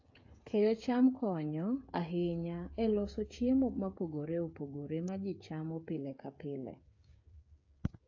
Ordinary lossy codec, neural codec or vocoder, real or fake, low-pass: AAC, 48 kbps; codec, 16 kHz, 8 kbps, FreqCodec, smaller model; fake; 7.2 kHz